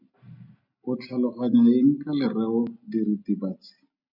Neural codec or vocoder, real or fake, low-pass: none; real; 5.4 kHz